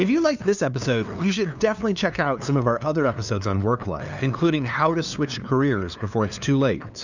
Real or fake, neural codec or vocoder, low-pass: fake; codec, 16 kHz, 2 kbps, FunCodec, trained on LibriTTS, 25 frames a second; 7.2 kHz